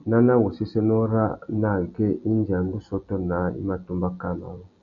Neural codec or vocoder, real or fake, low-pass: none; real; 7.2 kHz